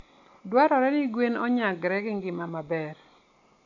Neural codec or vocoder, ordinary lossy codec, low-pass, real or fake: none; AAC, 48 kbps; 7.2 kHz; real